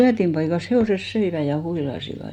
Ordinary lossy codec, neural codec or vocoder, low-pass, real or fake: none; none; 19.8 kHz; real